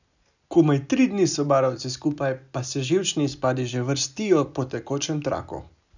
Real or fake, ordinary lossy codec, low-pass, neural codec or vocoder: real; none; 7.2 kHz; none